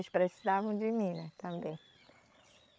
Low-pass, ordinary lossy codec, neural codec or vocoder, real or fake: none; none; codec, 16 kHz, 8 kbps, FreqCodec, larger model; fake